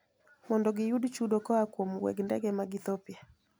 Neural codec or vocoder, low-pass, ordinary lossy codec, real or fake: none; none; none; real